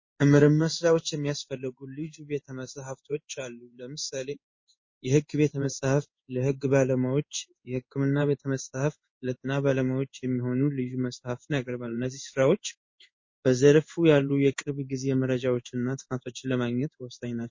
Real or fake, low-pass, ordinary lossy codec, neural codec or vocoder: fake; 7.2 kHz; MP3, 32 kbps; codec, 16 kHz in and 24 kHz out, 1 kbps, XY-Tokenizer